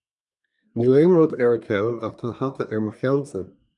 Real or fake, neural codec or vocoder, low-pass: fake; codec, 24 kHz, 1 kbps, SNAC; 10.8 kHz